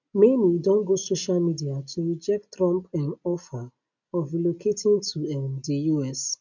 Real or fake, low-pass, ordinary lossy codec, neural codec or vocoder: real; 7.2 kHz; none; none